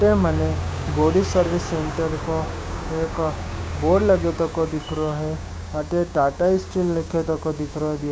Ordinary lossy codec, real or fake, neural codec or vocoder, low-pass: none; fake; codec, 16 kHz, 6 kbps, DAC; none